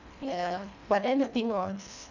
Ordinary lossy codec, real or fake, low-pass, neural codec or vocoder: none; fake; 7.2 kHz; codec, 24 kHz, 1.5 kbps, HILCodec